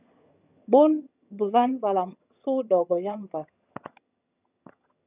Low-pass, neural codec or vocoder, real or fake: 3.6 kHz; vocoder, 22.05 kHz, 80 mel bands, HiFi-GAN; fake